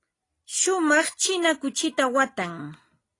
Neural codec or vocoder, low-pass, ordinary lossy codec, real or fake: none; 10.8 kHz; AAC, 32 kbps; real